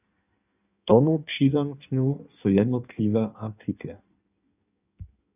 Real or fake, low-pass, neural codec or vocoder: fake; 3.6 kHz; codec, 16 kHz in and 24 kHz out, 1.1 kbps, FireRedTTS-2 codec